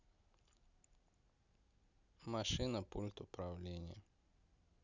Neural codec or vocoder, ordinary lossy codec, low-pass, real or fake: none; none; 7.2 kHz; real